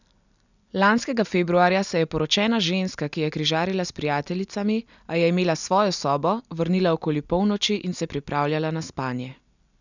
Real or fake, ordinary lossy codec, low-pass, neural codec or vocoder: real; none; 7.2 kHz; none